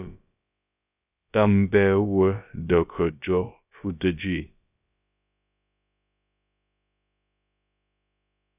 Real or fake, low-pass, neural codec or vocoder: fake; 3.6 kHz; codec, 16 kHz, about 1 kbps, DyCAST, with the encoder's durations